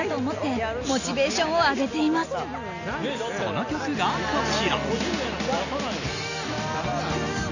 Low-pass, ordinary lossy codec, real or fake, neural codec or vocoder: 7.2 kHz; none; real; none